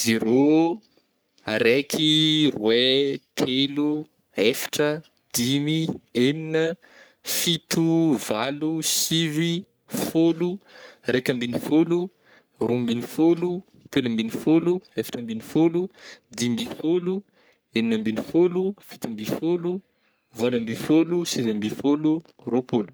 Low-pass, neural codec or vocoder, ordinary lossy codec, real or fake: none; codec, 44.1 kHz, 3.4 kbps, Pupu-Codec; none; fake